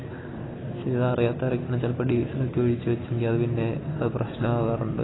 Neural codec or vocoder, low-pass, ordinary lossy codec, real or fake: none; 7.2 kHz; AAC, 16 kbps; real